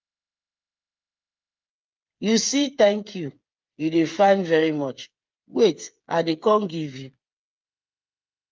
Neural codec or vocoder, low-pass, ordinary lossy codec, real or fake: codec, 16 kHz, 8 kbps, FreqCodec, smaller model; 7.2 kHz; Opus, 24 kbps; fake